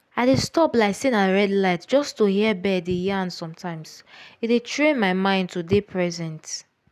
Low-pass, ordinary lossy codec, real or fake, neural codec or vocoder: 14.4 kHz; none; real; none